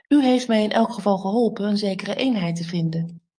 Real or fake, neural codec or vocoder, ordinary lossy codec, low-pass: fake; codec, 44.1 kHz, 7.8 kbps, DAC; AAC, 64 kbps; 9.9 kHz